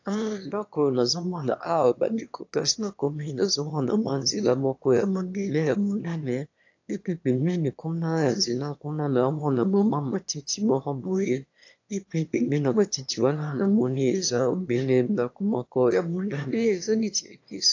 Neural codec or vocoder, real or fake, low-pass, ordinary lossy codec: autoencoder, 22.05 kHz, a latent of 192 numbers a frame, VITS, trained on one speaker; fake; 7.2 kHz; AAC, 48 kbps